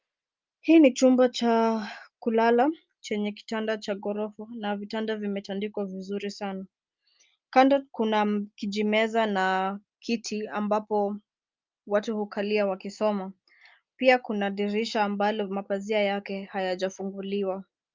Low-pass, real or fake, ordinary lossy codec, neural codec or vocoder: 7.2 kHz; real; Opus, 24 kbps; none